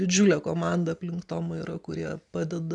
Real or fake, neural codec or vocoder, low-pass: real; none; 10.8 kHz